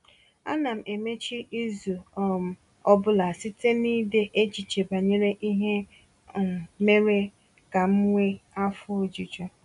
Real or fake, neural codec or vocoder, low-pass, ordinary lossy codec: real; none; 10.8 kHz; MP3, 96 kbps